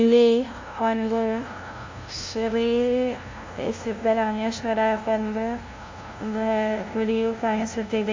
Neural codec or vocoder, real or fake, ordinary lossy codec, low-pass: codec, 16 kHz, 0.5 kbps, FunCodec, trained on LibriTTS, 25 frames a second; fake; MP3, 48 kbps; 7.2 kHz